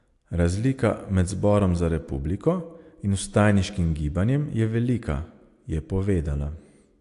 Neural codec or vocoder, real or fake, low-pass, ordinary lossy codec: none; real; 10.8 kHz; AAC, 64 kbps